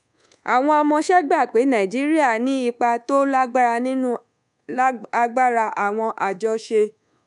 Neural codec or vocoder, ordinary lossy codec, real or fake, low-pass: codec, 24 kHz, 1.2 kbps, DualCodec; none; fake; 10.8 kHz